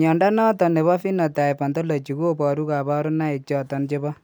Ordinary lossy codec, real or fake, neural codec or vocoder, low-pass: none; real; none; none